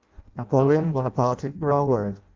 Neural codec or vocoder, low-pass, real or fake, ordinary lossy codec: codec, 16 kHz in and 24 kHz out, 0.6 kbps, FireRedTTS-2 codec; 7.2 kHz; fake; Opus, 32 kbps